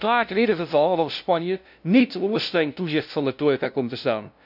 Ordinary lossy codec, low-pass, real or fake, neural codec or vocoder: none; 5.4 kHz; fake; codec, 16 kHz, 0.5 kbps, FunCodec, trained on LibriTTS, 25 frames a second